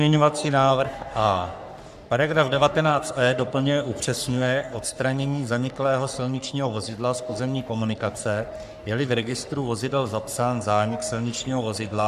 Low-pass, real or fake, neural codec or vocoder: 14.4 kHz; fake; codec, 44.1 kHz, 3.4 kbps, Pupu-Codec